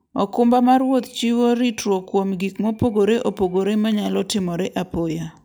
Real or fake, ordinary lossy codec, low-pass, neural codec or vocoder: real; none; none; none